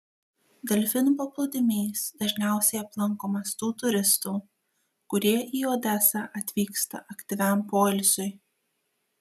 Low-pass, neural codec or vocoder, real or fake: 14.4 kHz; none; real